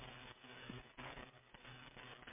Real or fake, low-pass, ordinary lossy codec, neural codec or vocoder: fake; 3.6 kHz; MP3, 24 kbps; codec, 16 kHz, 16 kbps, FreqCodec, smaller model